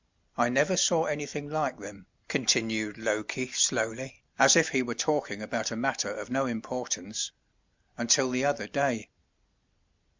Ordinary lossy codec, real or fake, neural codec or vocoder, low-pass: MP3, 64 kbps; real; none; 7.2 kHz